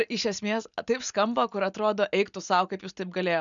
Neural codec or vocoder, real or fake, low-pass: none; real; 7.2 kHz